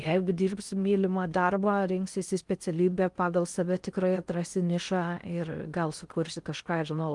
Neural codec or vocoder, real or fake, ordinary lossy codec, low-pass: codec, 16 kHz in and 24 kHz out, 0.6 kbps, FocalCodec, streaming, 4096 codes; fake; Opus, 32 kbps; 10.8 kHz